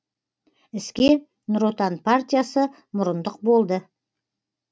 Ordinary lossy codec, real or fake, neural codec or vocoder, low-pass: none; real; none; none